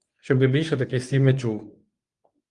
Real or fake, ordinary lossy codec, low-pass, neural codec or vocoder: fake; Opus, 32 kbps; 10.8 kHz; codec, 24 kHz, 0.9 kbps, WavTokenizer, medium speech release version 1